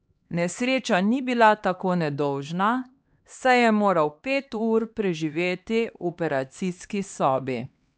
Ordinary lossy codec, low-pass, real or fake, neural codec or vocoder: none; none; fake; codec, 16 kHz, 2 kbps, X-Codec, HuBERT features, trained on LibriSpeech